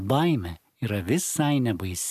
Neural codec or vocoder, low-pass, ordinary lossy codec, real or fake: none; 14.4 kHz; MP3, 96 kbps; real